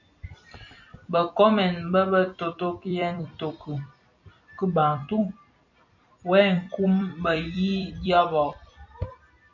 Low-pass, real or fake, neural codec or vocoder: 7.2 kHz; fake; vocoder, 44.1 kHz, 128 mel bands every 256 samples, BigVGAN v2